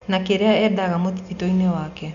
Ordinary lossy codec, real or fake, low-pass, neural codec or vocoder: none; real; 7.2 kHz; none